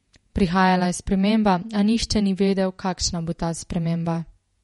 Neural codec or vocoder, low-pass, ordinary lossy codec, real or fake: vocoder, 48 kHz, 128 mel bands, Vocos; 19.8 kHz; MP3, 48 kbps; fake